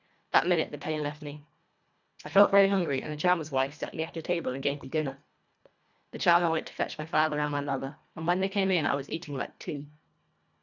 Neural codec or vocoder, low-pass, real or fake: codec, 24 kHz, 1.5 kbps, HILCodec; 7.2 kHz; fake